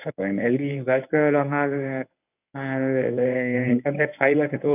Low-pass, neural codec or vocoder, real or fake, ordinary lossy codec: 3.6 kHz; codec, 24 kHz, 0.9 kbps, WavTokenizer, medium speech release version 1; fake; none